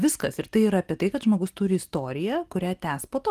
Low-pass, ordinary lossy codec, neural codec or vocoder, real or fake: 14.4 kHz; Opus, 24 kbps; none; real